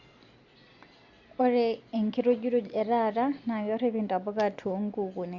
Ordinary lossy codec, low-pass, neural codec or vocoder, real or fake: Opus, 64 kbps; 7.2 kHz; none; real